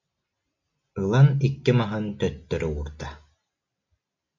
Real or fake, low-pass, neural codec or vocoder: real; 7.2 kHz; none